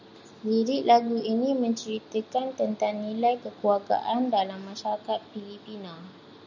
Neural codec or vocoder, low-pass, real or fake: none; 7.2 kHz; real